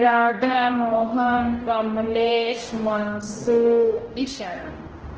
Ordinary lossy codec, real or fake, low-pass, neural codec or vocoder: Opus, 16 kbps; fake; 7.2 kHz; codec, 16 kHz, 0.5 kbps, X-Codec, HuBERT features, trained on general audio